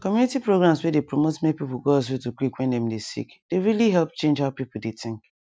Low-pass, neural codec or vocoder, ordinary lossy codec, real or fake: none; none; none; real